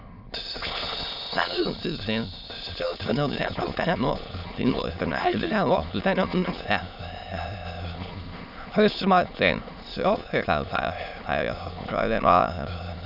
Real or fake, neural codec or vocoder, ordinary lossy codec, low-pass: fake; autoencoder, 22.05 kHz, a latent of 192 numbers a frame, VITS, trained on many speakers; none; 5.4 kHz